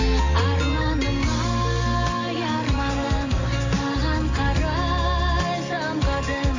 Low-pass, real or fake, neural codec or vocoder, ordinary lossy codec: 7.2 kHz; real; none; AAC, 48 kbps